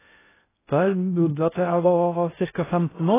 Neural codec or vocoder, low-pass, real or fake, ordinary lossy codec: codec, 16 kHz in and 24 kHz out, 0.6 kbps, FocalCodec, streaming, 2048 codes; 3.6 kHz; fake; AAC, 16 kbps